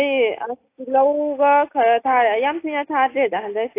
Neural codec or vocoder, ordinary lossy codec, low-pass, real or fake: none; AAC, 24 kbps; 3.6 kHz; real